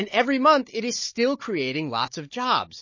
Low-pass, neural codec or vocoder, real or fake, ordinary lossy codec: 7.2 kHz; codec, 16 kHz, 16 kbps, FunCodec, trained on Chinese and English, 50 frames a second; fake; MP3, 32 kbps